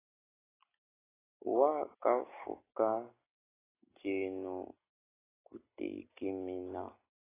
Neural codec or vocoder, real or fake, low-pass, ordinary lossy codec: none; real; 3.6 kHz; AAC, 16 kbps